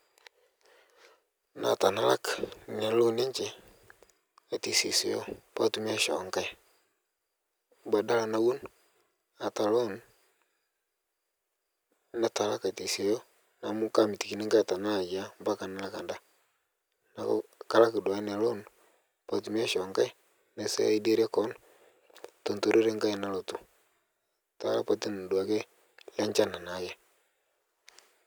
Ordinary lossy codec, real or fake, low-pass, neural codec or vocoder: none; real; none; none